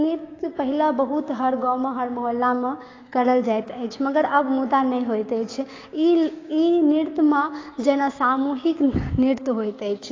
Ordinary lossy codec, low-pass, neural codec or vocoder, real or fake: AAC, 32 kbps; 7.2 kHz; codec, 16 kHz, 6 kbps, DAC; fake